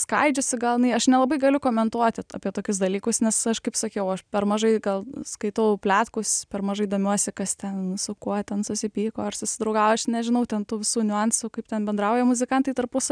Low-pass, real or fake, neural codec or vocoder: 9.9 kHz; real; none